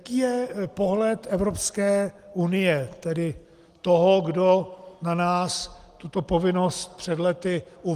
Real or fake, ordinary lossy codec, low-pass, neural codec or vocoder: real; Opus, 24 kbps; 14.4 kHz; none